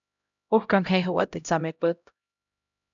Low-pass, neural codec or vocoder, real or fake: 7.2 kHz; codec, 16 kHz, 0.5 kbps, X-Codec, HuBERT features, trained on LibriSpeech; fake